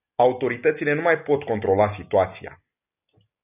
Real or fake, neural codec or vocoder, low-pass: real; none; 3.6 kHz